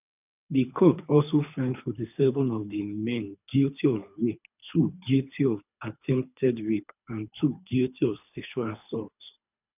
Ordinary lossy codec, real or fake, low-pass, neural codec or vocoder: none; fake; 3.6 kHz; codec, 24 kHz, 3 kbps, HILCodec